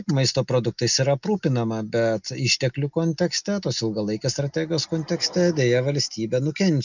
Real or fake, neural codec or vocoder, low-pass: real; none; 7.2 kHz